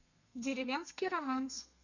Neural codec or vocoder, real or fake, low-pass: codec, 32 kHz, 1.9 kbps, SNAC; fake; 7.2 kHz